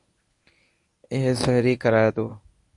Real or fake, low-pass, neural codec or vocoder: fake; 10.8 kHz; codec, 24 kHz, 0.9 kbps, WavTokenizer, medium speech release version 1